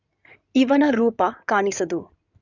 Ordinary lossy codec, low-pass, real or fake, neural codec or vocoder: none; 7.2 kHz; fake; codec, 16 kHz in and 24 kHz out, 2.2 kbps, FireRedTTS-2 codec